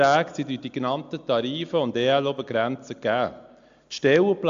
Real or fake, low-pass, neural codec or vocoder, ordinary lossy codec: real; 7.2 kHz; none; AAC, 64 kbps